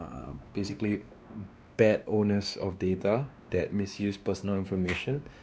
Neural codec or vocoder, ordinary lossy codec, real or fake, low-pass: codec, 16 kHz, 2 kbps, X-Codec, WavLM features, trained on Multilingual LibriSpeech; none; fake; none